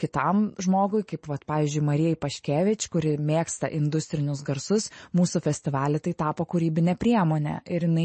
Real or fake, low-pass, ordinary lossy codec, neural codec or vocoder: real; 10.8 kHz; MP3, 32 kbps; none